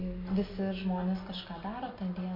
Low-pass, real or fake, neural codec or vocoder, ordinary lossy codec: 5.4 kHz; real; none; MP3, 32 kbps